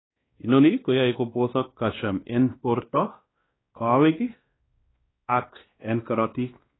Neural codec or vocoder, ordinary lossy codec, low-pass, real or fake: codec, 16 kHz, 1 kbps, X-Codec, WavLM features, trained on Multilingual LibriSpeech; AAC, 16 kbps; 7.2 kHz; fake